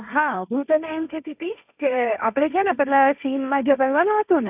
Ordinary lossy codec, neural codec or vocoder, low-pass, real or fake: none; codec, 16 kHz, 1.1 kbps, Voila-Tokenizer; 3.6 kHz; fake